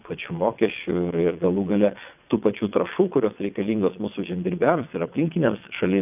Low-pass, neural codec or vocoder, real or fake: 3.6 kHz; vocoder, 22.05 kHz, 80 mel bands, WaveNeXt; fake